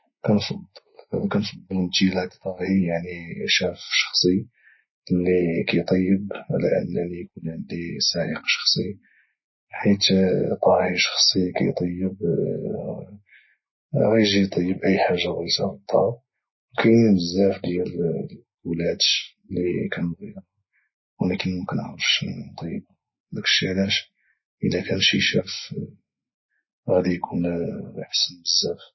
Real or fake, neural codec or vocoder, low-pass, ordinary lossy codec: real; none; 7.2 kHz; MP3, 24 kbps